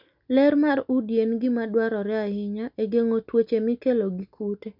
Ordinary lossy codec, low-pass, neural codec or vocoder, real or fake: none; 5.4 kHz; none; real